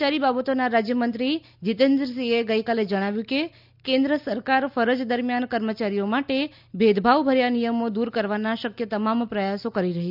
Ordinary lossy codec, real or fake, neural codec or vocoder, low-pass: none; real; none; 5.4 kHz